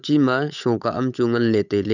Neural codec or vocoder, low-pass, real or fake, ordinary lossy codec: codec, 16 kHz, 8 kbps, FunCodec, trained on Chinese and English, 25 frames a second; 7.2 kHz; fake; none